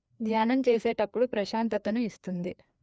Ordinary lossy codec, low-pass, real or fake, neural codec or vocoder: none; none; fake; codec, 16 kHz, 2 kbps, FreqCodec, larger model